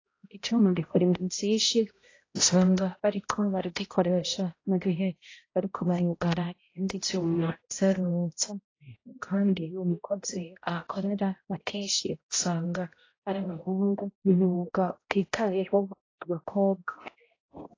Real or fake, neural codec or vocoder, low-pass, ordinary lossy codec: fake; codec, 16 kHz, 0.5 kbps, X-Codec, HuBERT features, trained on balanced general audio; 7.2 kHz; AAC, 32 kbps